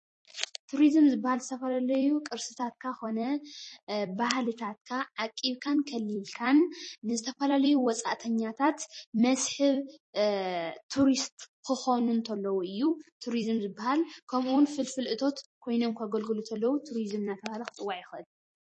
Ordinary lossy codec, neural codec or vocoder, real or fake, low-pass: MP3, 32 kbps; vocoder, 44.1 kHz, 128 mel bands every 256 samples, BigVGAN v2; fake; 10.8 kHz